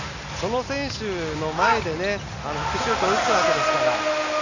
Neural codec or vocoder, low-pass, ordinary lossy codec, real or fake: none; 7.2 kHz; none; real